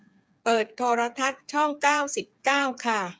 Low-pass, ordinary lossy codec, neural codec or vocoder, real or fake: none; none; codec, 16 kHz, 8 kbps, FreqCodec, smaller model; fake